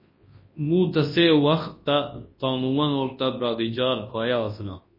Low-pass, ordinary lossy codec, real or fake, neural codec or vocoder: 5.4 kHz; MP3, 24 kbps; fake; codec, 24 kHz, 0.9 kbps, WavTokenizer, large speech release